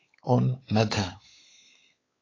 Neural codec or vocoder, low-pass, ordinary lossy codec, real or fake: codec, 16 kHz, 4 kbps, X-Codec, WavLM features, trained on Multilingual LibriSpeech; 7.2 kHz; AAC, 32 kbps; fake